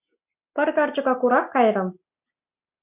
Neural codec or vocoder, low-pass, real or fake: none; 3.6 kHz; real